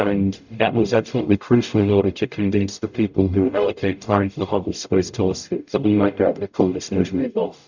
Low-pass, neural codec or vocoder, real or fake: 7.2 kHz; codec, 44.1 kHz, 0.9 kbps, DAC; fake